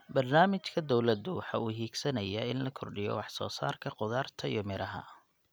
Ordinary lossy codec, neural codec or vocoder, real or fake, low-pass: none; none; real; none